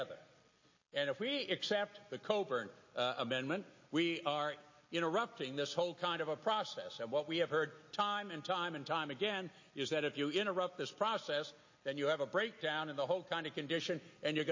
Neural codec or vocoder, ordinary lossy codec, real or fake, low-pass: none; MP3, 32 kbps; real; 7.2 kHz